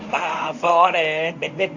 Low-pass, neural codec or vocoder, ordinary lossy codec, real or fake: 7.2 kHz; codec, 24 kHz, 0.9 kbps, WavTokenizer, medium speech release version 1; none; fake